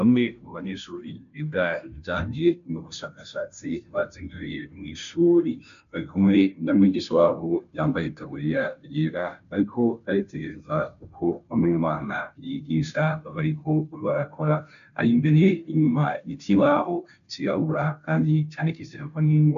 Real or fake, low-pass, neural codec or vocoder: fake; 7.2 kHz; codec, 16 kHz, 0.5 kbps, FunCodec, trained on Chinese and English, 25 frames a second